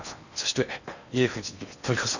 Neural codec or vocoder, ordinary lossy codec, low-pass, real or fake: codec, 16 kHz in and 24 kHz out, 0.8 kbps, FocalCodec, streaming, 65536 codes; none; 7.2 kHz; fake